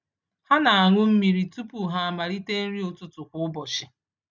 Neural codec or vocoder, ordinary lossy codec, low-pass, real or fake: none; none; 7.2 kHz; real